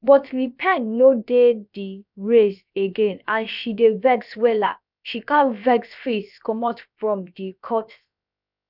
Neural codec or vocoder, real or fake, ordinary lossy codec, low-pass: codec, 16 kHz, about 1 kbps, DyCAST, with the encoder's durations; fake; none; 5.4 kHz